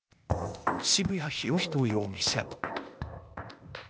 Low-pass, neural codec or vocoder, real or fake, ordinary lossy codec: none; codec, 16 kHz, 0.8 kbps, ZipCodec; fake; none